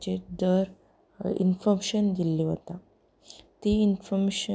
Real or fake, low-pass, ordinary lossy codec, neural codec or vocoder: real; none; none; none